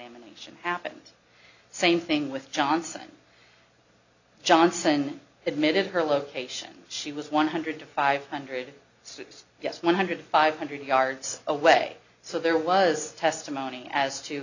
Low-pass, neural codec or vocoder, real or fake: 7.2 kHz; none; real